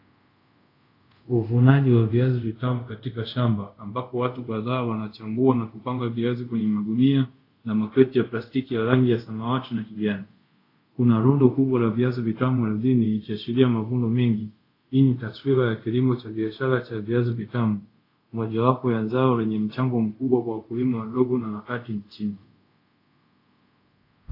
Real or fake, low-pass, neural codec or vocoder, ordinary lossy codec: fake; 5.4 kHz; codec, 24 kHz, 0.5 kbps, DualCodec; AAC, 32 kbps